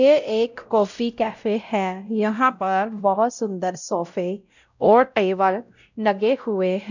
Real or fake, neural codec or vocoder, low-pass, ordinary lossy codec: fake; codec, 16 kHz, 0.5 kbps, X-Codec, WavLM features, trained on Multilingual LibriSpeech; 7.2 kHz; none